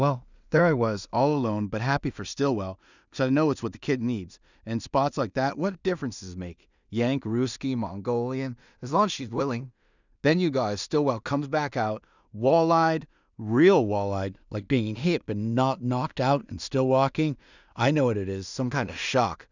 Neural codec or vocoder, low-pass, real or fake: codec, 16 kHz in and 24 kHz out, 0.4 kbps, LongCat-Audio-Codec, two codebook decoder; 7.2 kHz; fake